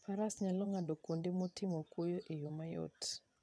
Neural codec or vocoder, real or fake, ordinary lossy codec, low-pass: vocoder, 22.05 kHz, 80 mel bands, WaveNeXt; fake; none; none